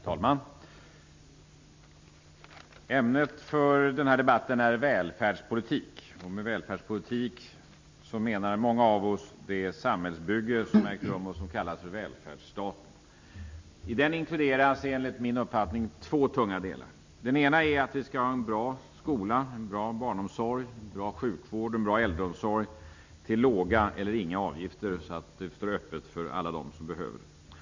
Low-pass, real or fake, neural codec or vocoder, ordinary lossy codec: 7.2 kHz; real; none; MP3, 64 kbps